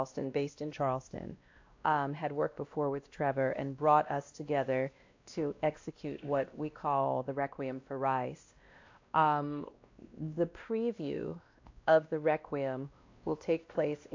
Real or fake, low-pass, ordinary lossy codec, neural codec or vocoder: fake; 7.2 kHz; AAC, 48 kbps; codec, 16 kHz, 1 kbps, X-Codec, WavLM features, trained on Multilingual LibriSpeech